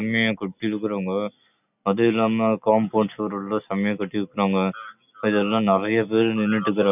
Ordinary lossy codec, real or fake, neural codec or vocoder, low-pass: none; real; none; 3.6 kHz